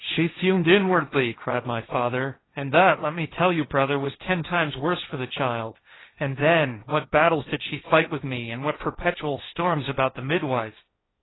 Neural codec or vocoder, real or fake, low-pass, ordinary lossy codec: codec, 16 kHz, 1.1 kbps, Voila-Tokenizer; fake; 7.2 kHz; AAC, 16 kbps